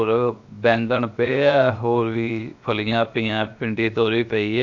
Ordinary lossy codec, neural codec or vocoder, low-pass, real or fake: none; codec, 16 kHz, 0.7 kbps, FocalCodec; 7.2 kHz; fake